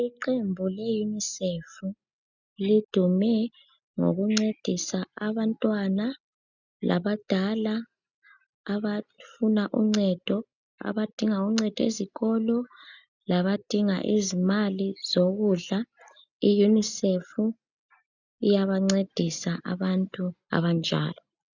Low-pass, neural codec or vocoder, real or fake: 7.2 kHz; none; real